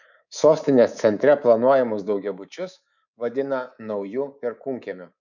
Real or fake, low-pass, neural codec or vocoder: fake; 7.2 kHz; codec, 24 kHz, 3.1 kbps, DualCodec